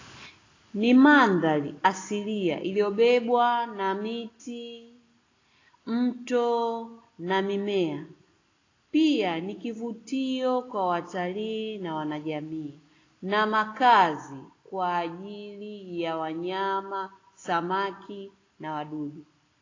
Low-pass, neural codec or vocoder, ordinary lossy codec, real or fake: 7.2 kHz; none; AAC, 32 kbps; real